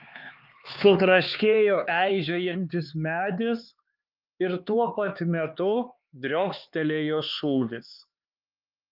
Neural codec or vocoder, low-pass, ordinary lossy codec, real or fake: codec, 16 kHz, 4 kbps, X-Codec, HuBERT features, trained on LibriSpeech; 5.4 kHz; Opus, 24 kbps; fake